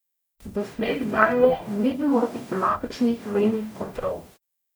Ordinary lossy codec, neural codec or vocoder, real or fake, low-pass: none; codec, 44.1 kHz, 0.9 kbps, DAC; fake; none